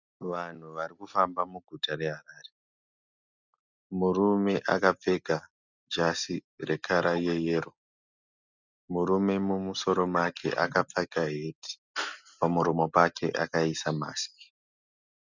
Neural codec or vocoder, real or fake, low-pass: none; real; 7.2 kHz